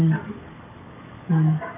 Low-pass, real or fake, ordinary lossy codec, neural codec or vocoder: 3.6 kHz; fake; none; codec, 44.1 kHz, 7.8 kbps, DAC